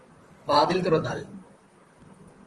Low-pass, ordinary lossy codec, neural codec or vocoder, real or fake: 10.8 kHz; Opus, 24 kbps; vocoder, 44.1 kHz, 128 mel bands, Pupu-Vocoder; fake